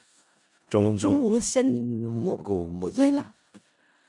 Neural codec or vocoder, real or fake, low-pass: codec, 16 kHz in and 24 kHz out, 0.4 kbps, LongCat-Audio-Codec, four codebook decoder; fake; 10.8 kHz